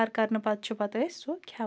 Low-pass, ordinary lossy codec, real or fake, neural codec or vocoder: none; none; real; none